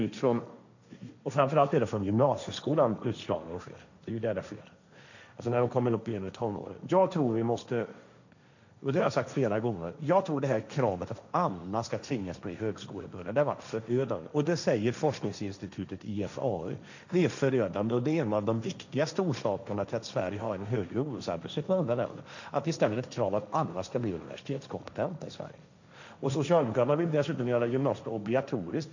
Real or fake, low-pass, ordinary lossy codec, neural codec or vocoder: fake; 7.2 kHz; none; codec, 16 kHz, 1.1 kbps, Voila-Tokenizer